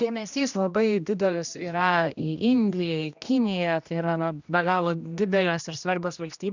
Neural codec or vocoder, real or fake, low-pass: codec, 16 kHz, 1 kbps, X-Codec, HuBERT features, trained on general audio; fake; 7.2 kHz